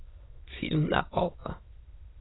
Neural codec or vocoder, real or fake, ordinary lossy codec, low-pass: autoencoder, 22.05 kHz, a latent of 192 numbers a frame, VITS, trained on many speakers; fake; AAC, 16 kbps; 7.2 kHz